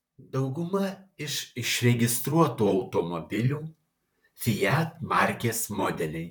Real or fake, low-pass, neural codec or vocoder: fake; 19.8 kHz; vocoder, 44.1 kHz, 128 mel bands, Pupu-Vocoder